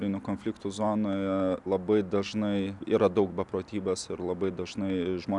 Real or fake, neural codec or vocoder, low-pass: real; none; 10.8 kHz